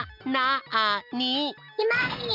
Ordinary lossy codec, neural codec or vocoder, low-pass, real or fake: none; none; 5.4 kHz; real